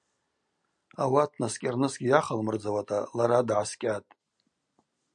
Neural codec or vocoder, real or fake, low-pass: none; real; 9.9 kHz